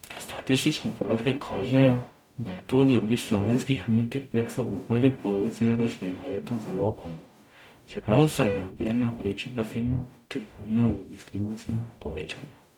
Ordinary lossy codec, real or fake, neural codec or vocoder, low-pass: none; fake; codec, 44.1 kHz, 0.9 kbps, DAC; 19.8 kHz